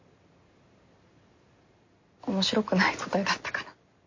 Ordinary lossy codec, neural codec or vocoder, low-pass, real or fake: MP3, 32 kbps; none; 7.2 kHz; real